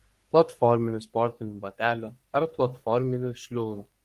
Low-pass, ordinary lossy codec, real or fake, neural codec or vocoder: 14.4 kHz; Opus, 24 kbps; fake; codec, 44.1 kHz, 3.4 kbps, Pupu-Codec